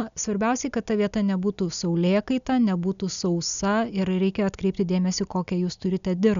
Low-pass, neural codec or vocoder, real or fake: 7.2 kHz; none; real